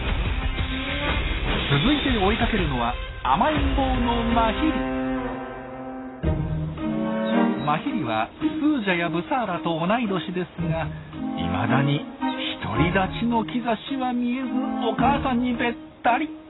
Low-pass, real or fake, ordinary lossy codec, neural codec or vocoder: 7.2 kHz; real; AAC, 16 kbps; none